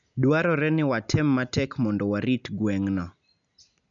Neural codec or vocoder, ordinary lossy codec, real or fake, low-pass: none; none; real; 7.2 kHz